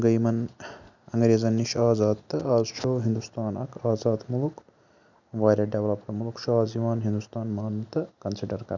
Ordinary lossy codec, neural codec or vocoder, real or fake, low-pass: none; none; real; 7.2 kHz